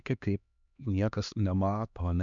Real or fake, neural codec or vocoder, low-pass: fake; codec, 16 kHz, 2 kbps, X-Codec, HuBERT features, trained on LibriSpeech; 7.2 kHz